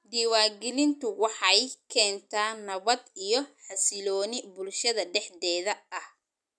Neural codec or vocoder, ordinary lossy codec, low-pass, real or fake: none; none; none; real